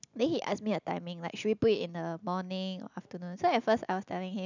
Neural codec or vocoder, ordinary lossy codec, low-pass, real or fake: none; none; 7.2 kHz; real